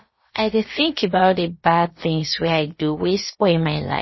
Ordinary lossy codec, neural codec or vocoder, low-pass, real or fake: MP3, 24 kbps; codec, 16 kHz, about 1 kbps, DyCAST, with the encoder's durations; 7.2 kHz; fake